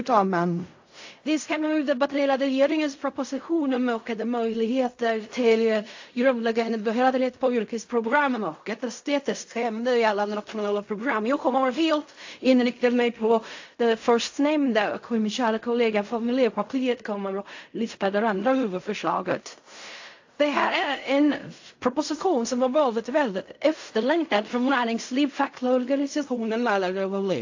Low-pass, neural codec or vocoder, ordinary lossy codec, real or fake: 7.2 kHz; codec, 16 kHz in and 24 kHz out, 0.4 kbps, LongCat-Audio-Codec, fine tuned four codebook decoder; AAC, 48 kbps; fake